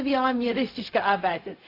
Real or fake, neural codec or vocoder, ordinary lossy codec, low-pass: fake; codec, 16 kHz, 0.4 kbps, LongCat-Audio-Codec; AAC, 32 kbps; 5.4 kHz